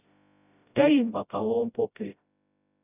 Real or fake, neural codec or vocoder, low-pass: fake; codec, 16 kHz, 0.5 kbps, FreqCodec, smaller model; 3.6 kHz